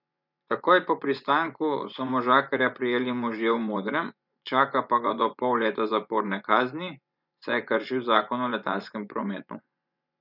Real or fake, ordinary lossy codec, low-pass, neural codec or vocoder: fake; none; 5.4 kHz; vocoder, 24 kHz, 100 mel bands, Vocos